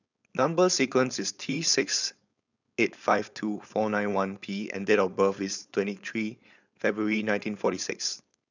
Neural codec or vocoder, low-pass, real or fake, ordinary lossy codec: codec, 16 kHz, 4.8 kbps, FACodec; 7.2 kHz; fake; none